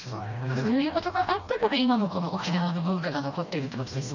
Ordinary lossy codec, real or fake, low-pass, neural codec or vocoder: none; fake; 7.2 kHz; codec, 16 kHz, 1 kbps, FreqCodec, smaller model